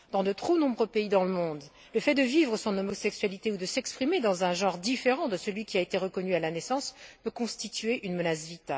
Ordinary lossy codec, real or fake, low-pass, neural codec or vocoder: none; real; none; none